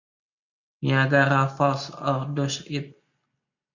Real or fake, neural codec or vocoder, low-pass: real; none; 7.2 kHz